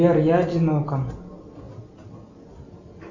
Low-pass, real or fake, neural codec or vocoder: 7.2 kHz; real; none